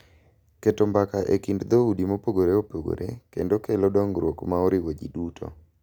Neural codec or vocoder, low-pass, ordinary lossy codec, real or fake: none; 19.8 kHz; none; real